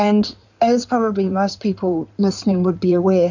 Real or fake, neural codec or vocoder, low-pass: fake; codec, 16 kHz in and 24 kHz out, 2.2 kbps, FireRedTTS-2 codec; 7.2 kHz